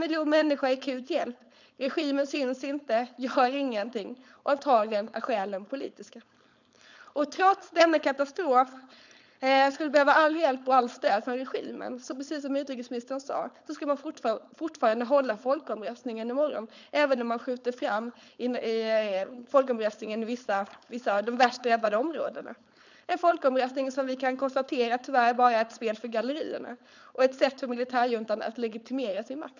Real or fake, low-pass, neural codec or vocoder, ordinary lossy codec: fake; 7.2 kHz; codec, 16 kHz, 4.8 kbps, FACodec; none